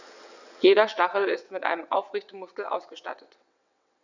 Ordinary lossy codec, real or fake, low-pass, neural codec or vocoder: none; fake; 7.2 kHz; vocoder, 22.05 kHz, 80 mel bands, WaveNeXt